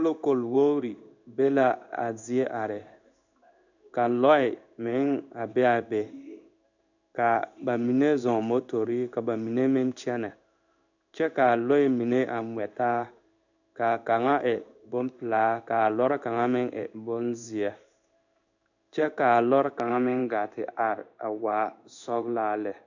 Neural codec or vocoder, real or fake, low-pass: codec, 16 kHz in and 24 kHz out, 1 kbps, XY-Tokenizer; fake; 7.2 kHz